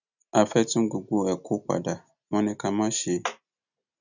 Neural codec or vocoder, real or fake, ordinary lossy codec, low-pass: none; real; none; 7.2 kHz